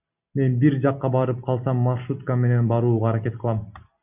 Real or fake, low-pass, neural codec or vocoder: real; 3.6 kHz; none